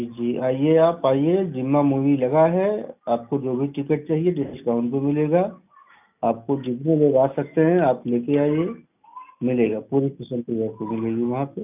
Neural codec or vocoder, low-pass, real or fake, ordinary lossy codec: none; 3.6 kHz; real; none